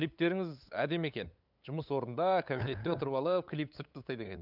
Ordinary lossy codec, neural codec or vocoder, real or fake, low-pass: none; codec, 16 kHz, 8 kbps, FunCodec, trained on LibriTTS, 25 frames a second; fake; 5.4 kHz